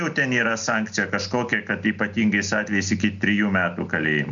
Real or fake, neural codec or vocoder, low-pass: real; none; 7.2 kHz